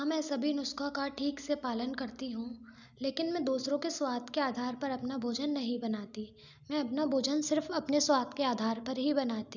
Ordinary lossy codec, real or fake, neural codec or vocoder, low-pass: none; real; none; 7.2 kHz